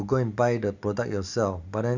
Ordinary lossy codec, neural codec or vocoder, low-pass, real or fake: none; none; 7.2 kHz; real